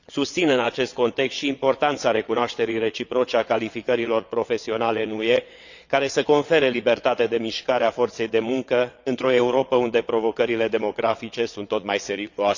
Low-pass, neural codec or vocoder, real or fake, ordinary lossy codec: 7.2 kHz; vocoder, 22.05 kHz, 80 mel bands, WaveNeXt; fake; none